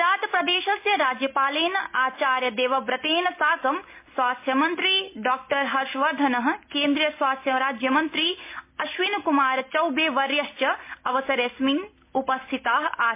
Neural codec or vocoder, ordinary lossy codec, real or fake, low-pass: none; MP3, 24 kbps; real; 3.6 kHz